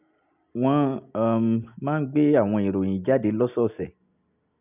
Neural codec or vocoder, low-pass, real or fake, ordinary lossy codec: none; 3.6 kHz; real; none